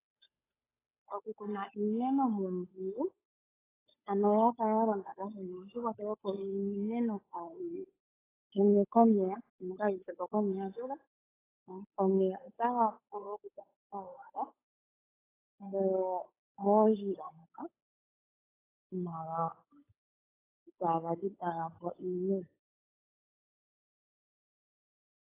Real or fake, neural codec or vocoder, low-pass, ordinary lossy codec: fake; codec, 16 kHz, 8 kbps, FunCodec, trained on Chinese and English, 25 frames a second; 3.6 kHz; AAC, 16 kbps